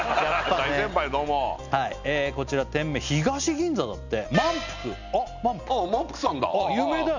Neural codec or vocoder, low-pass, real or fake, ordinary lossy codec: none; 7.2 kHz; real; none